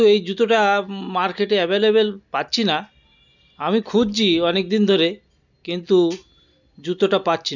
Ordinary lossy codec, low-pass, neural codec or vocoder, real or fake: none; 7.2 kHz; none; real